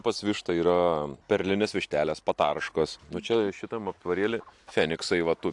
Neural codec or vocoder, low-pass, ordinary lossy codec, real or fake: none; 10.8 kHz; AAC, 64 kbps; real